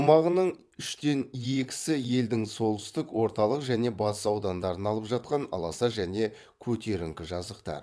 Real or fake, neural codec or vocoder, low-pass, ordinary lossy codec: fake; vocoder, 22.05 kHz, 80 mel bands, WaveNeXt; none; none